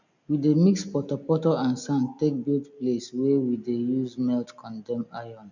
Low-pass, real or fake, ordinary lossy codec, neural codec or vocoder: 7.2 kHz; real; none; none